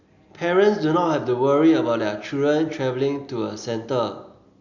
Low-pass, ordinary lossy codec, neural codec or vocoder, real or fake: 7.2 kHz; Opus, 64 kbps; none; real